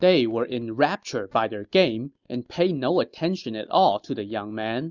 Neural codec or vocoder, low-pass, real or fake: none; 7.2 kHz; real